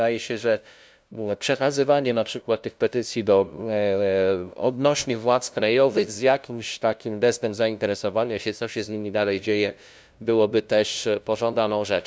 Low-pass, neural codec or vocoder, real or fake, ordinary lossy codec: none; codec, 16 kHz, 0.5 kbps, FunCodec, trained on LibriTTS, 25 frames a second; fake; none